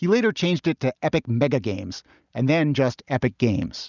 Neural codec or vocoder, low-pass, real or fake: none; 7.2 kHz; real